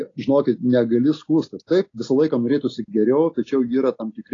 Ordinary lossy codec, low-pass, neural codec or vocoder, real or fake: AAC, 32 kbps; 7.2 kHz; none; real